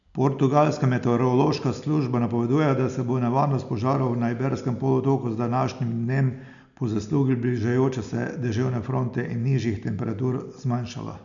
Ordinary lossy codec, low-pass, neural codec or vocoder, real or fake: none; 7.2 kHz; none; real